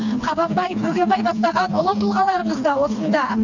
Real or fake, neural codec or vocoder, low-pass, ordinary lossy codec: fake; codec, 16 kHz, 2 kbps, FreqCodec, smaller model; 7.2 kHz; none